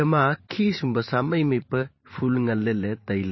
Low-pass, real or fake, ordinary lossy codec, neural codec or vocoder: 7.2 kHz; fake; MP3, 24 kbps; vocoder, 44.1 kHz, 128 mel bands every 512 samples, BigVGAN v2